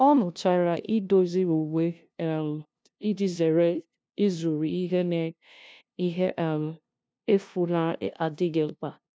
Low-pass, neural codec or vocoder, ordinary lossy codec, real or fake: none; codec, 16 kHz, 0.5 kbps, FunCodec, trained on LibriTTS, 25 frames a second; none; fake